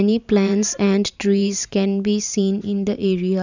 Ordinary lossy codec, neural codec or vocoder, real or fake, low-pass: none; vocoder, 44.1 kHz, 128 mel bands every 512 samples, BigVGAN v2; fake; 7.2 kHz